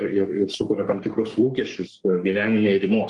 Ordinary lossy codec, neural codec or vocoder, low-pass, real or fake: Opus, 24 kbps; codec, 44.1 kHz, 3.4 kbps, Pupu-Codec; 10.8 kHz; fake